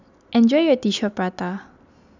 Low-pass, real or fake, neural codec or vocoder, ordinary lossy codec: 7.2 kHz; real; none; none